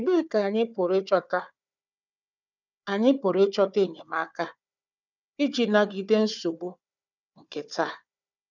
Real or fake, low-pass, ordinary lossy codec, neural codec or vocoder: fake; 7.2 kHz; none; codec, 16 kHz, 4 kbps, FunCodec, trained on Chinese and English, 50 frames a second